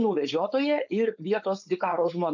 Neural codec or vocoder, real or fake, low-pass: codec, 16 kHz, 4.8 kbps, FACodec; fake; 7.2 kHz